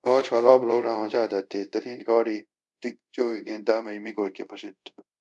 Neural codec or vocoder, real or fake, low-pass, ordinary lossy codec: codec, 24 kHz, 0.5 kbps, DualCodec; fake; 10.8 kHz; MP3, 96 kbps